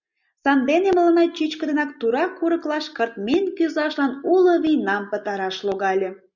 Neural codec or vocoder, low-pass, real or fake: none; 7.2 kHz; real